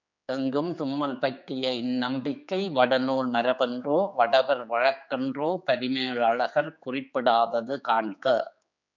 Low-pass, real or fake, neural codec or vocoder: 7.2 kHz; fake; codec, 16 kHz, 4 kbps, X-Codec, HuBERT features, trained on general audio